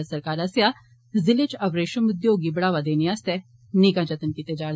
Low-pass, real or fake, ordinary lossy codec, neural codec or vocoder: none; real; none; none